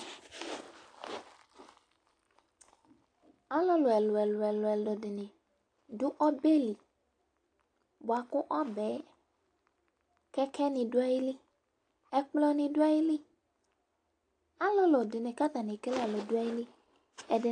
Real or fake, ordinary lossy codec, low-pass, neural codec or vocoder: real; AAC, 48 kbps; 9.9 kHz; none